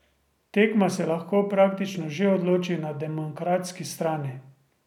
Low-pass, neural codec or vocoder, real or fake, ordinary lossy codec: 19.8 kHz; none; real; none